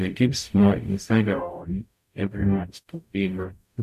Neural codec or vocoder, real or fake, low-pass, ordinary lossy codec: codec, 44.1 kHz, 0.9 kbps, DAC; fake; 14.4 kHz; none